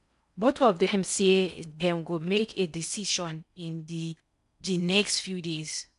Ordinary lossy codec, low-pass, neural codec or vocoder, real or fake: none; 10.8 kHz; codec, 16 kHz in and 24 kHz out, 0.6 kbps, FocalCodec, streaming, 4096 codes; fake